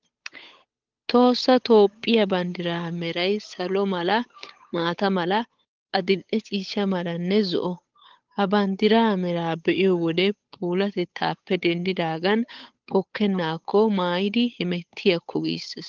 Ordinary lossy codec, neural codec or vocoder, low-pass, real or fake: Opus, 32 kbps; codec, 16 kHz, 8 kbps, FunCodec, trained on Chinese and English, 25 frames a second; 7.2 kHz; fake